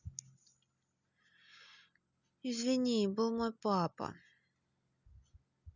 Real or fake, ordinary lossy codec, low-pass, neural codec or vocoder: real; none; 7.2 kHz; none